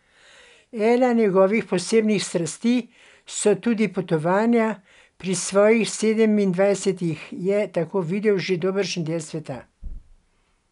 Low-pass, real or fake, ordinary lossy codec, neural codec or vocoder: 10.8 kHz; real; none; none